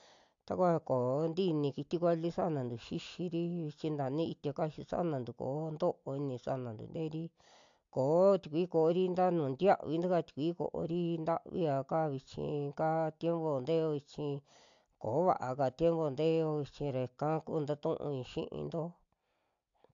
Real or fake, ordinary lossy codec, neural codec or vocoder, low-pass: real; AAC, 64 kbps; none; 7.2 kHz